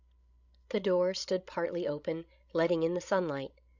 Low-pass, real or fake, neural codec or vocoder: 7.2 kHz; fake; codec, 16 kHz, 16 kbps, FreqCodec, larger model